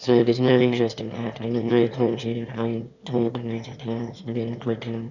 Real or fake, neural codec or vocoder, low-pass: fake; autoencoder, 22.05 kHz, a latent of 192 numbers a frame, VITS, trained on one speaker; 7.2 kHz